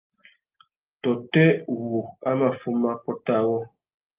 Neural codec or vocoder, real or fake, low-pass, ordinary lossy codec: none; real; 3.6 kHz; Opus, 24 kbps